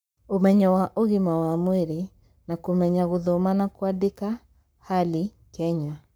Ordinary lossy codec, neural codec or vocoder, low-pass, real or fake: none; codec, 44.1 kHz, 7.8 kbps, Pupu-Codec; none; fake